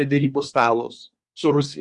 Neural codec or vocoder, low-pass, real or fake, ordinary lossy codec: codec, 24 kHz, 1 kbps, SNAC; 10.8 kHz; fake; Opus, 64 kbps